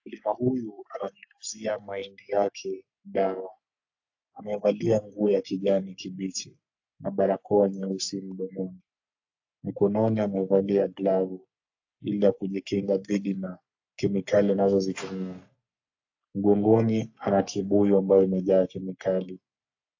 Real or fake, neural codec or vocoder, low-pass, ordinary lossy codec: fake; codec, 44.1 kHz, 3.4 kbps, Pupu-Codec; 7.2 kHz; AAC, 48 kbps